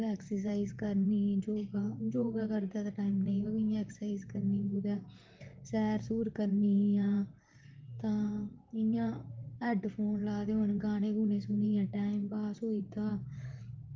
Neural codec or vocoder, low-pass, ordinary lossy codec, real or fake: vocoder, 44.1 kHz, 128 mel bands, Pupu-Vocoder; 7.2 kHz; Opus, 32 kbps; fake